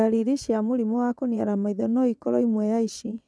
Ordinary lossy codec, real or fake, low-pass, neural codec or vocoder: none; fake; none; vocoder, 22.05 kHz, 80 mel bands, WaveNeXt